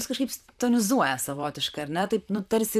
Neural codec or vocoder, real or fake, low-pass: vocoder, 44.1 kHz, 128 mel bands, Pupu-Vocoder; fake; 14.4 kHz